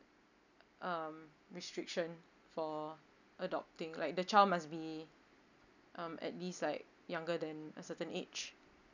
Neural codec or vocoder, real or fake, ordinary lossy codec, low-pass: none; real; none; 7.2 kHz